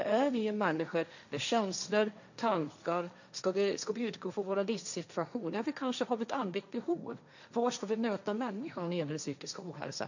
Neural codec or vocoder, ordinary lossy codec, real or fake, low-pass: codec, 16 kHz, 1.1 kbps, Voila-Tokenizer; none; fake; 7.2 kHz